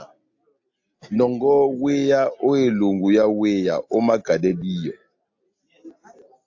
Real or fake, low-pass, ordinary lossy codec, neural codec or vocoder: real; 7.2 kHz; AAC, 48 kbps; none